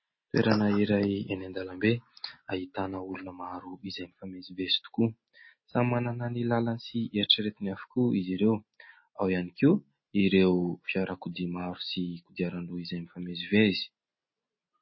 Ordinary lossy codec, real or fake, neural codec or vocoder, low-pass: MP3, 24 kbps; real; none; 7.2 kHz